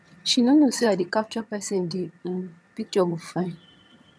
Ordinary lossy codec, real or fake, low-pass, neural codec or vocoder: none; fake; none; vocoder, 22.05 kHz, 80 mel bands, HiFi-GAN